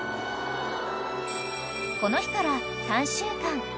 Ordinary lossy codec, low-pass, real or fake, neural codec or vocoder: none; none; real; none